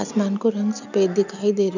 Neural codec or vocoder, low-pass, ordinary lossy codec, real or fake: none; 7.2 kHz; none; real